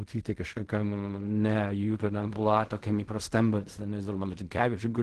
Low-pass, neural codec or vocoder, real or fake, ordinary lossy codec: 10.8 kHz; codec, 16 kHz in and 24 kHz out, 0.4 kbps, LongCat-Audio-Codec, fine tuned four codebook decoder; fake; Opus, 16 kbps